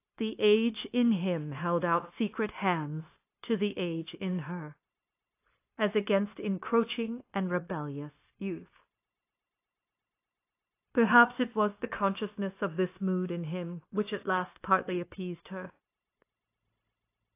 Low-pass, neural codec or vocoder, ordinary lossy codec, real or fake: 3.6 kHz; codec, 16 kHz, 0.9 kbps, LongCat-Audio-Codec; AAC, 24 kbps; fake